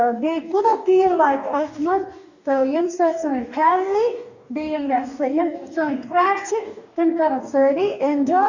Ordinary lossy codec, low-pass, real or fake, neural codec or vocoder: none; 7.2 kHz; fake; codec, 44.1 kHz, 2.6 kbps, DAC